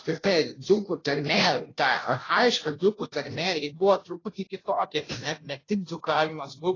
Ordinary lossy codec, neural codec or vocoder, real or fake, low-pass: AAC, 32 kbps; codec, 16 kHz, 0.5 kbps, FunCodec, trained on Chinese and English, 25 frames a second; fake; 7.2 kHz